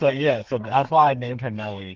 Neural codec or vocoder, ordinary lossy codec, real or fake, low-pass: codec, 32 kHz, 1.9 kbps, SNAC; Opus, 32 kbps; fake; 7.2 kHz